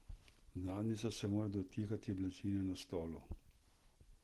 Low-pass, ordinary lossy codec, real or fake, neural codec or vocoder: 14.4 kHz; Opus, 16 kbps; real; none